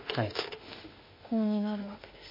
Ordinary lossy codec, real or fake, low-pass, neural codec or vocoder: MP3, 24 kbps; fake; 5.4 kHz; autoencoder, 48 kHz, 32 numbers a frame, DAC-VAE, trained on Japanese speech